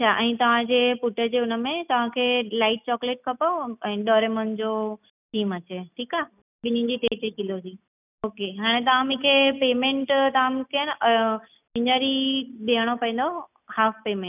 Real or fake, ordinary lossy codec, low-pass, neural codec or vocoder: real; none; 3.6 kHz; none